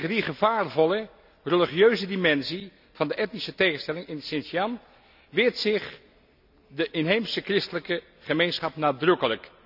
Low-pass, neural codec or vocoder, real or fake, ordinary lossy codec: 5.4 kHz; none; real; none